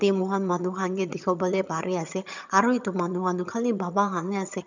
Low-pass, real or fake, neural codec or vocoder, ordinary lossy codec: 7.2 kHz; fake; vocoder, 22.05 kHz, 80 mel bands, HiFi-GAN; none